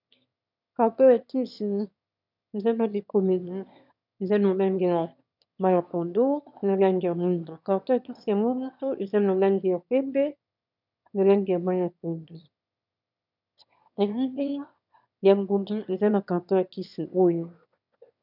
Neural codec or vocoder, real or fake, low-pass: autoencoder, 22.05 kHz, a latent of 192 numbers a frame, VITS, trained on one speaker; fake; 5.4 kHz